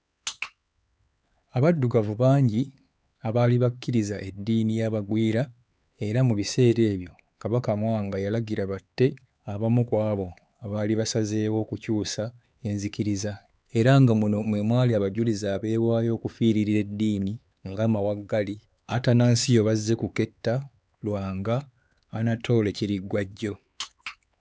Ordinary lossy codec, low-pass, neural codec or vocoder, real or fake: none; none; codec, 16 kHz, 4 kbps, X-Codec, HuBERT features, trained on LibriSpeech; fake